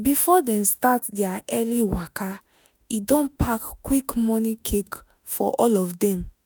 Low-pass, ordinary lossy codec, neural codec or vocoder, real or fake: none; none; autoencoder, 48 kHz, 32 numbers a frame, DAC-VAE, trained on Japanese speech; fake